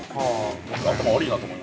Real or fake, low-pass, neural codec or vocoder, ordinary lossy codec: real; none; none; none